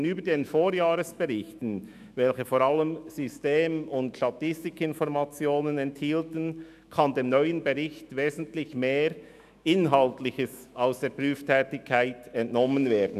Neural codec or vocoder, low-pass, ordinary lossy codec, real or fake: autoencoder, 48 kHz, 128 numbers a frame, DAC-VAE, trained on Japanese speech; 14.4 kHz; none; fake